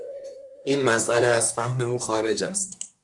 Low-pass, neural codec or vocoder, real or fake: 10.8 kHz; codec, 24 kHz, 1 kbps, SNAC; fake